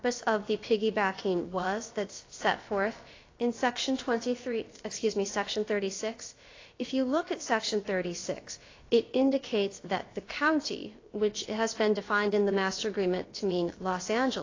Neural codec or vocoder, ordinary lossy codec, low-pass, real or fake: codec, 16 kHz, about 1 kbps, DyCAST, with the encoder's durations; AAC, 32 kbps; 7.2 kHz; fake